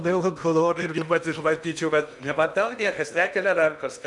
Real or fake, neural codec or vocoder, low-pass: fake; codec, 16 kHz in and 24 kHz out, 0.8 kbps, FocalCodec, streaming, 65536 codes; 10.8 kHz